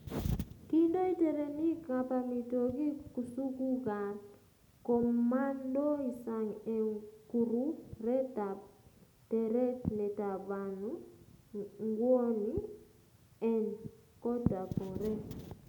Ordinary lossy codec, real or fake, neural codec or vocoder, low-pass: none; real; none; none